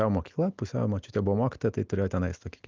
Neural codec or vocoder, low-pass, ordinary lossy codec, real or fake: none; 7.2 kHz; Opus, 24 kbps; real